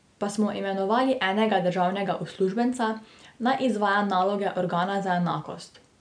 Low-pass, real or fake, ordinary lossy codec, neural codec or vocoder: 9.9 kHz; real; none; none